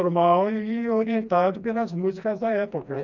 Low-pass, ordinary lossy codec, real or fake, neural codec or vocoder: 7.2 kHz; none; fake; codec, 16 kHz, 2 kbps, FreqCodec, smaller model